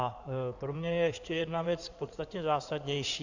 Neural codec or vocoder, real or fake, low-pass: codec, 16 kHz in and 24 kHz out, 2.2 kbps, FireRedTTS-2 codec; fake; 7.2 kHz